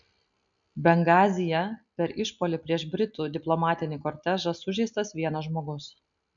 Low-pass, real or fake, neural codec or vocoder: 7.2 kHz; real; none